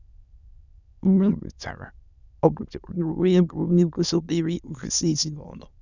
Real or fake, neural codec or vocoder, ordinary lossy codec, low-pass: fake; autoencoder, 22.05 kHz, a latent of 192 numbers a frame, VITS, trained on many speakers; none; 7.2 kHz